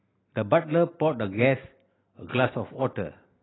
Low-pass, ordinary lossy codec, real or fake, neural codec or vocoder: 7.2 kHz; AAC, 16 kbps; real; none